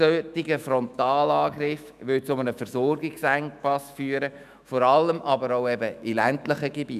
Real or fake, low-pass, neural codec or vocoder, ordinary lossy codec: fake; 14.4 kHz; autoencoder, 48 kHz, 128 numbers a frame, DAC-VAE, trained on Japanese speech; none